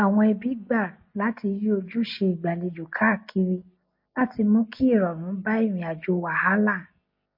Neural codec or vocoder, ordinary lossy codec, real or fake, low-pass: none; MP3, 32 kbps; real; 5.4 kHz